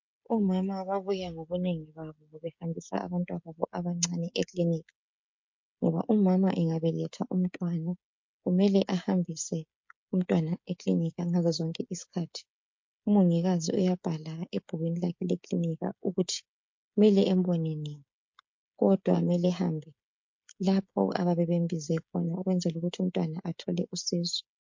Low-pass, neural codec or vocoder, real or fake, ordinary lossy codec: 7.2 kHz; codec, 16 kHz, 16 kbps, FreqCodec, smaller model; fake; MP3, 48 kbps